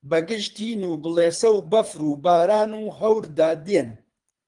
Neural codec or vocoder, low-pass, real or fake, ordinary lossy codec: codec, 24 kHz, 3 kbps, HILCodec; 10.8 kHz; fake; Opus, 32 kbps